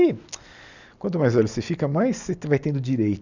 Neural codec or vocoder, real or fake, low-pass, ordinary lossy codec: none; real; 7.2 kHz; none